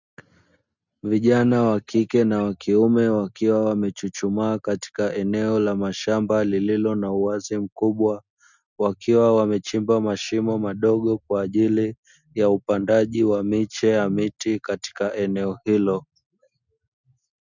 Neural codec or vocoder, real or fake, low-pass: none; real; 7.2 kHz